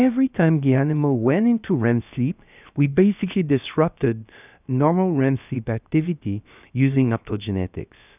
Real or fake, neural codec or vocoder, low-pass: fake; codec, 16 kHz, about 1 kbps, DyCAST, with the encoder's durations; 3.6 kHz